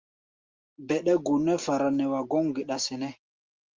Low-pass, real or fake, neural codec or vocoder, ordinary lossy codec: 7.2 kHz; real; none; Opus, 32 kbps